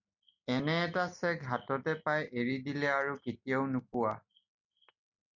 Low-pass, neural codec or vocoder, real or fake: 7.2 kHz; none; real